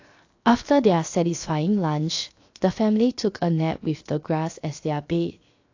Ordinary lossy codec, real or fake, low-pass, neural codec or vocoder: AAC, 48 kbps; fake; 7.2 kHz; codec, 16 kHz, 0.7 kbps, FocalCodec